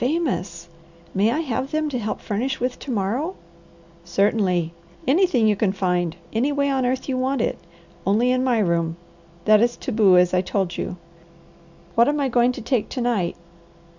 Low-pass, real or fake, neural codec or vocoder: 7.2 kHz; real; none